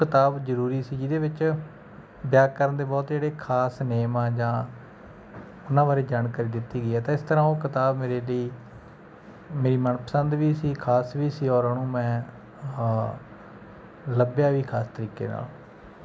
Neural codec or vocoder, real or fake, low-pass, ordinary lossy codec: none; real; none; none